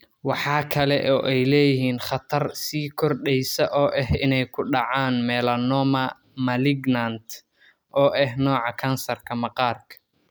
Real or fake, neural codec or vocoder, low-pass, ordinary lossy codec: real; none; none; none